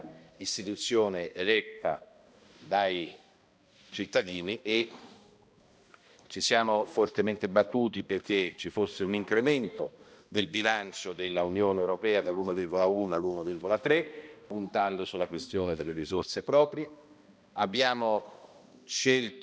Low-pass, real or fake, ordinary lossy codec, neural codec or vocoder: none; fake; none; codec, 16 kHz, 1 kbps, X-Codec, HuBERT features, trained on balanced general audio